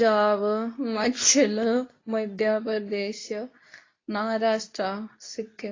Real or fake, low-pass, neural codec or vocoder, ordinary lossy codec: fake; 7.2 kHz; codec, 24 kHz, 0.9 kbps, WavTokenizer, medium speech release version 2; AAC, 32 kbps